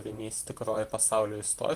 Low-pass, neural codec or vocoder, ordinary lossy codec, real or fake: 14.4 kHz; vocoder, 44.1 kHz, 128 mel bands, Pupu-Vocoder; Opus, 24 kbps; fake